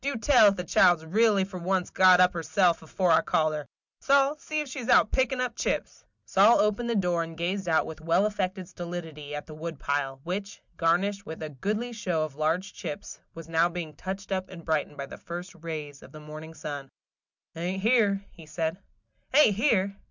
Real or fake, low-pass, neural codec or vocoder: real; 7.2 kHz; none